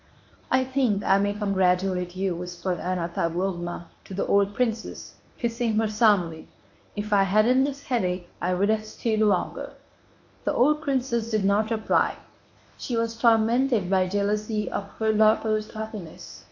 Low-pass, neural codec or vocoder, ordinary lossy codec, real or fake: 7.2 kHz; codec, 24 kHz, 0.9 kbps, WavTokenizer, medium speech release version 1; AAC, 48 kbps; fake